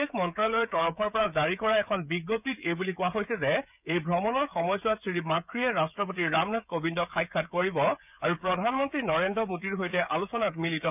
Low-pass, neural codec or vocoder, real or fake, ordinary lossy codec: 3.6 kHz; codec, 16 kHz, 16 kbps, FreqCodec, smaller model; fake; none